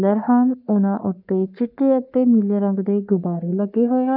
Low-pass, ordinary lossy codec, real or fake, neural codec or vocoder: 5.4 kHz; none; fake; codec, 44.1 kHz, 3.4 kbps, Pupu-Codec